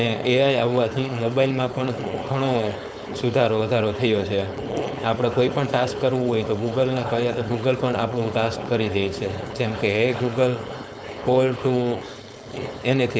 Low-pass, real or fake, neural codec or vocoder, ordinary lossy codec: none; fake; codec, 16 kHz, 4.8 kbps, FACodec; none